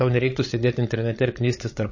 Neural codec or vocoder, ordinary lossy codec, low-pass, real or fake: codec, 16 kHz, 16 kbps, FreqCodec, larger model; MP3, 32 kbps; 7.2 kHz; fake